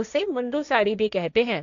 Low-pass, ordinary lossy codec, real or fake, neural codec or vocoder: 7.2 kHz; none; fake; codec, 16 kHz, 1.1 kbps, Voila-Tokenizer